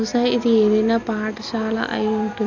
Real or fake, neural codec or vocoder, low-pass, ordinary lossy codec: real; none; 7.2 kHz; none